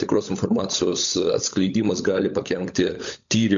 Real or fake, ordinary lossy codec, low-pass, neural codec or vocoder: fake; MP3, 64 kbps; 7.2 kHz; codec, 16 kHz, 16 kbps, FunCodec, trained on LibriTTS, 50 frames a second